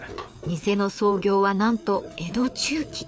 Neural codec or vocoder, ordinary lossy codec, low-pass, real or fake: codec, 16 kHz, 4 kbps, FreqCodec, larger model; none; none; fake